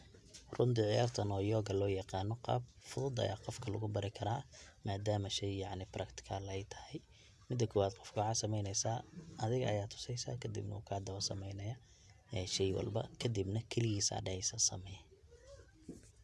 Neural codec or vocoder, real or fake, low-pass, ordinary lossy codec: none; real; none; none